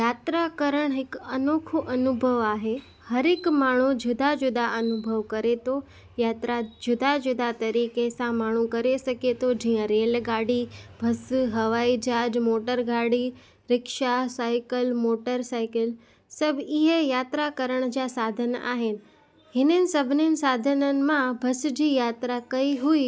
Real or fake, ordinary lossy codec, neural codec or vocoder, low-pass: real; none; none; none